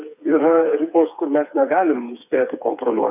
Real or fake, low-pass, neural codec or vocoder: fake; 3.6 kHz; codec, 16 kHz, 4 kbps, FreqCodec, smaller model